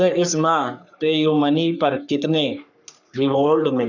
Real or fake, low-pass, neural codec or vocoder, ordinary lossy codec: fake; 7.2 kHz; codec, 44.1 kHz, 3.4 kbps, Pupu-Codec; none